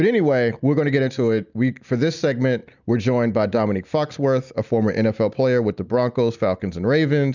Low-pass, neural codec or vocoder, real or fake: 7.2 kHz; none; real